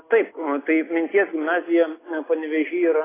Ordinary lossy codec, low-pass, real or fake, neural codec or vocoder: AAC, 16 kbps; 3.6 kHz; real; none